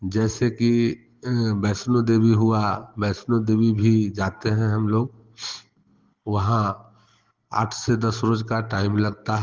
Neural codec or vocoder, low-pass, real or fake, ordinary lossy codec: none; 7.2 kHz; real; Opus, 16 kbps